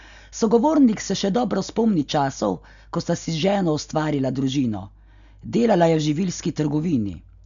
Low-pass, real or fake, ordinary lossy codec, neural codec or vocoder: 7.2 kHz; real; none; none